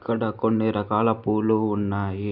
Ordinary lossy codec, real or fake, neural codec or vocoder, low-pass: none; real; none; 5.4 kHz